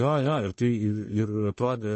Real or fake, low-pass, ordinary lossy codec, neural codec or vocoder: fake; 10.8 kHz; MP3, 32 kbps; codec, 44.1 kHz, 1.7 kbps, Pupu-Codec